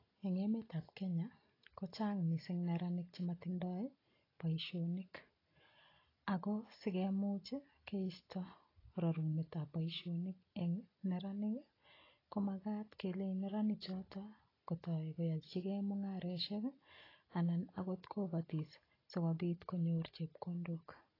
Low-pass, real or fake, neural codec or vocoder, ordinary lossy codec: 5.4 kHz; real; none; AAC, 24 kbps